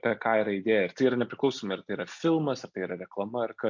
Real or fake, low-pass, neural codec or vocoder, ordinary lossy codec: real; 7.2 kHz; none; MP3, 48 kbps